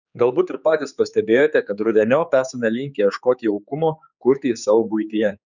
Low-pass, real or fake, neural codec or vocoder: 7.2 kHz; fake; codec, 16 kHz, 4 kbps, X-Codec, HuBERT features, trained on general audio